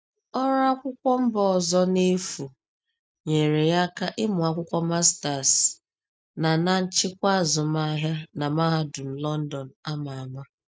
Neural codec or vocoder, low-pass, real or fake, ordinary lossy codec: none; none; real; none